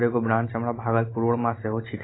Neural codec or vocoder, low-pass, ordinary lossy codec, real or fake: none; 7.2 kHz; AAC, 16 kbps; real